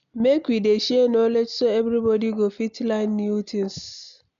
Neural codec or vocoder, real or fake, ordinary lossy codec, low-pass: none; real; none; 7.2 kHz